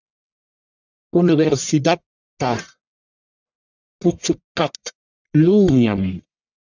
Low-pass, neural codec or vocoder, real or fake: 7.2 kHz; codec, 44.1 kHz, 1.7 kbps, Pupu-Codec; fake